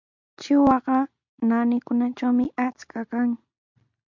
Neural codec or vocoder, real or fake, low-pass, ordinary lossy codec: none; real; 7.2 kHz; MP3, 64 kbps